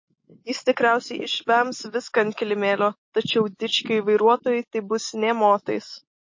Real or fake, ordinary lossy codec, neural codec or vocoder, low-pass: real; MP3, 32 kbps; none; 7.2 kHz